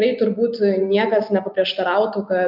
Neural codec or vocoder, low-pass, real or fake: none; 5.4 kHz; real